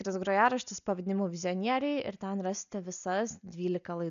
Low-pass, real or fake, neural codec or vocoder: 7.2 kHz; real; none